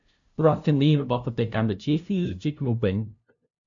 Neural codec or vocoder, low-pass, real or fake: codec, 16 kHz, 0.5 kbps, FunCodec, trained on LibriTTS, 25 frames a second; 7.2 kHz; fake